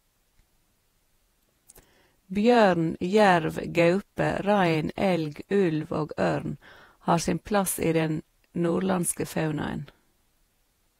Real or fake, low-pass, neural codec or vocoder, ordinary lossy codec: fake; 19.8 kHz; vocoder, 48 kHz, 128 mel bands, Vocos; AAC, 48 kbps